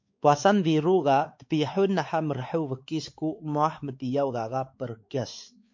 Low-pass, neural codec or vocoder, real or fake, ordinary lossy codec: 7.2 kHz; codec, 16 kHz, 4 kbps, X-Codec, WavLM features, trained on Multilingual LibriSpeech; fake; MP3, 48 kbps